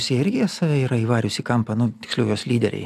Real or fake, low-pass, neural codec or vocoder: real; 14.4 kHz; none